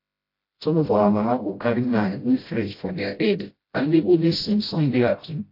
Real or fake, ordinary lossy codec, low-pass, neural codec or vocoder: fake; AAC, 32 kbps; 5.4 kHz; codec, 16 kHz, 0.5 kbps, FreqCodec, smaller model